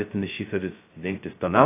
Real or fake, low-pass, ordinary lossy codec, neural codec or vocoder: fake; 3.6 kHz; AAC, 24 kbps; codec, 16 kHz, 0.2 kbps, FocalCodec